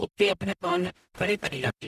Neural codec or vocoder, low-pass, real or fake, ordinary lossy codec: codec, 44.1 kHz, 0.9 kbps, DAC; 14.4 kHz; fake; none